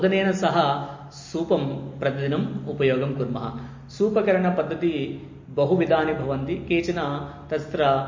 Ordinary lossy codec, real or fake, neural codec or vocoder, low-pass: MP3, 32 kbps; real; none; 7.2 kHz